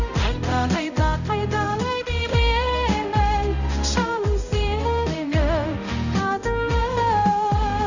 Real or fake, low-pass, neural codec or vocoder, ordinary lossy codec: fake; 7.2 kHz; codec, 16 kHz, 0.5 kbps, X-Codec, HuBERT features, trained on balanced general audio; none